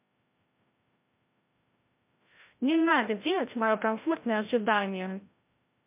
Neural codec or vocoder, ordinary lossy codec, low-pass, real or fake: codec, 16 kHz, 0.5 kbps, FreqCodec, larger model; MP3, 32 kbps; 3.6 kHz; fake